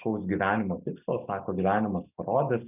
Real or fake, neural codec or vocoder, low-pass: real; none; 3.6 kHz